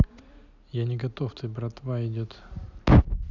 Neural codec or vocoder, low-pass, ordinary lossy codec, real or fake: none; 7.2 kHz; none; real